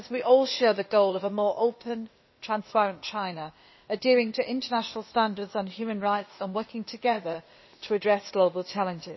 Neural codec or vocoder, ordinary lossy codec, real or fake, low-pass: codec, 16 kHz, 0.8 kbps, ZipCodec; MP3, 24 kbps; fake; 7.2 kHz